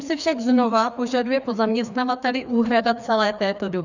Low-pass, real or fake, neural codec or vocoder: 7.2 kHz; fake; codec, 16 kHz, 2 kbps, FreqCodec, larger model